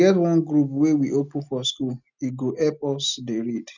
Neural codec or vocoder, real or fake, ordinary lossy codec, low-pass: none; real; none; 7.2 kHz